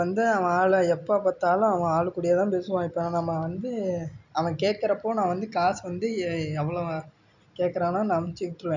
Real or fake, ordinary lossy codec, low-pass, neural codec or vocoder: real; none; 7.2 kHz; none